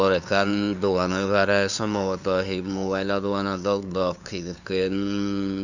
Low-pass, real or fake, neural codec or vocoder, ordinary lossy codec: 7.2 kHz; fake; codec, 16 kHz, 2 kbps, FunCodec, trained on LibriTTS, 25 frames a second; none